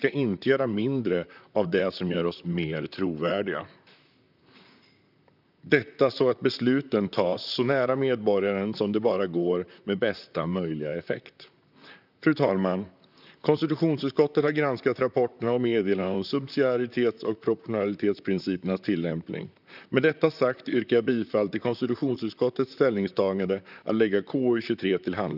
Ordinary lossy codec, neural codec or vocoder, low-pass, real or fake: none; vocoder, 44.1 kHz, 128 mel bands, Pupu-Vocoder; 5.4 kHz; fake